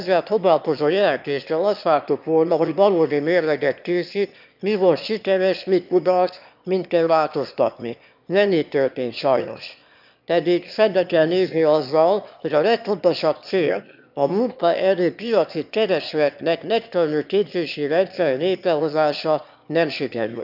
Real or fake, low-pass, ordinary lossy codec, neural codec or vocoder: fake; 5.4 kHz; none; autoencoder, 22.05 kHz, a latent of 192 numbers a frame, VITS, trained on one speaker